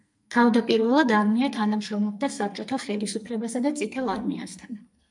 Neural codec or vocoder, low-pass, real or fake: codec, 44.1 kHz, 2.6 kbps, SNAC; 10.8 kHz; fake